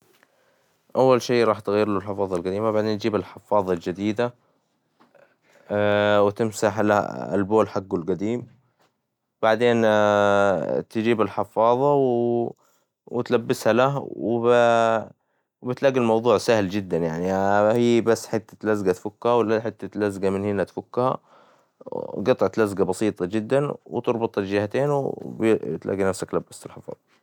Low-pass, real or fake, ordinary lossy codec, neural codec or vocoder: 19.8 kHz; real; none; none